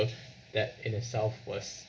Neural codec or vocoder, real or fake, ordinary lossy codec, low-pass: none; real; none; none